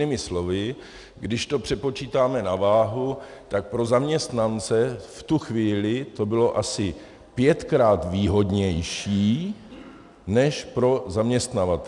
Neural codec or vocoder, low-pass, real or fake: none; 10.8 kHz; real